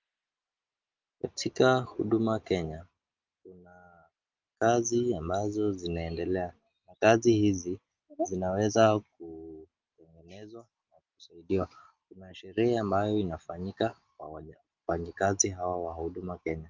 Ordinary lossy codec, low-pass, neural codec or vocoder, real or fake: Opus, 24 kbps; 7.2 kHz; none; real